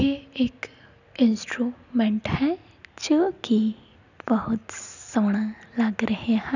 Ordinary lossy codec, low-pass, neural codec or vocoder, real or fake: none; 7.2 kHz; none; real